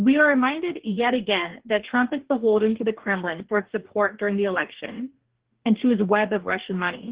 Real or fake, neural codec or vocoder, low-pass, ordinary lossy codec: fake; codec, 44.1 kHz, 2.6 kbps, DAC; 3.6 kHz; Opus, 16 kbps